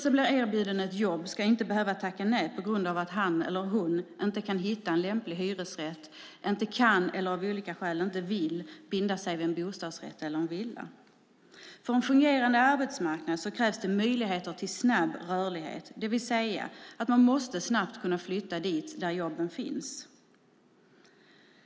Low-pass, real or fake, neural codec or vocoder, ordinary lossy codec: none; real; none; none